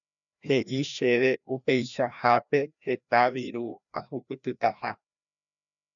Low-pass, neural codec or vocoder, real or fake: 7.2 kHz; codec, 16 kHz, 1 kbps, FreqCodec, larger model; fake